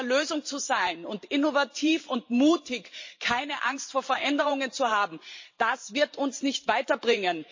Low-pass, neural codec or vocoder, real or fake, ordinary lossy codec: 7.2 kHz; vocoder, 44.1 kHz, 128 mel bands every 512 samples, BigVGAN v2; fake; MP3, 32 kbps